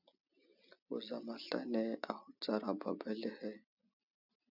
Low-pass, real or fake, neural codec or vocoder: 5.4 kHz; real; none